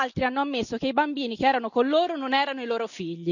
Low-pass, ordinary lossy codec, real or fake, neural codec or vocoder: 7.2 kHz; none; real; none